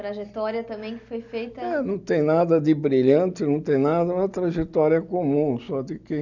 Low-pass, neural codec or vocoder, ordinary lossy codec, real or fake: 7.2 kHz; none; none; real